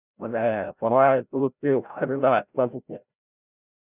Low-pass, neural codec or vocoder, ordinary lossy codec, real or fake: 3.6 kHz; codec, 16 kHz, 0.5 kbps, FreqCodec, larger model; none; fake